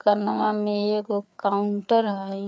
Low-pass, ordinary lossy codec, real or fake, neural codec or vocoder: none; none; fake; codec, 16 kHz, 4 kbps, FreqCodec, larger model